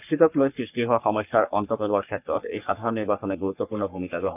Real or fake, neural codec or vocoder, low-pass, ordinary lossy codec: fake; codec, 44.1 kHz, 3.4 kbps, Pupu-Codec; 3.6 kHz; none